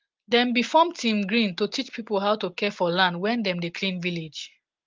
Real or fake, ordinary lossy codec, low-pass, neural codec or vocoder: real; Opus, 32 kbps; 7.2 kHz; none